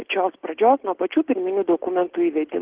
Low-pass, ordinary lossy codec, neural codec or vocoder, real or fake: 3.6 kHz; Opus, 16 kbps; none; real